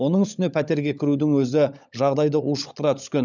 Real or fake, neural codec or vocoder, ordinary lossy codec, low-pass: fake; codec, 16 kHz, 16 kbps, FunCodec, trained on LibriTTS, 50 frames a second; none; 7.2 kHz